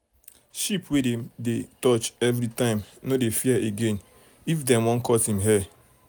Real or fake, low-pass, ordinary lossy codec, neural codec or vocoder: real; none; none; none